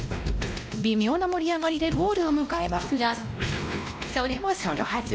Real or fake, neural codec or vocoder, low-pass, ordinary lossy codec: fake; codec, 16 kHz, 1 kbps, X-Codec, WavLM features, trained on Multilingual LibriSpeech; none; none